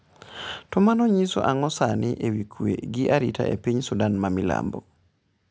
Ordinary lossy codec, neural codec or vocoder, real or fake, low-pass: none; none; real; none